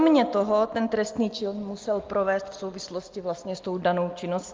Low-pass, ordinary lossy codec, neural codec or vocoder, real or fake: 7.2 kHz; Opus, 32 kbps; none; real